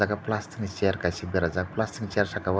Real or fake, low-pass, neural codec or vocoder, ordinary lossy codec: real; none; none; none